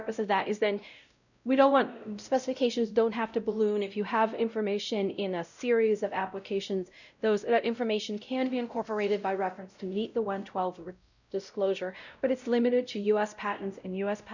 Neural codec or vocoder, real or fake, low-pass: codec, 16 kHz, 0.5 kbps, X-Codec, WavLM features, trained on Multilingual LibriSpeech; fake; 7.2 kHz